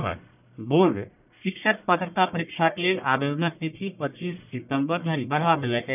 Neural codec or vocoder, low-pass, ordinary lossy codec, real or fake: codec, 44.1 kHz, 1.7 kbps, Pupu-Codec; 3.6 kHz; none; fake